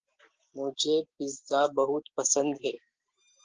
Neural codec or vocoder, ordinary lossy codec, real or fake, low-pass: none; Opus, 16 kbps; real; 7.2 kHz